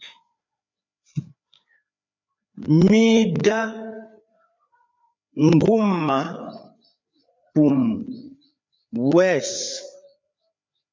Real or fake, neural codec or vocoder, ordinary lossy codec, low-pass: fake; codec, 16 kHz, 4 kbps, FreqCodec, larger model; MP3, 64 kbps; 7.2 kHz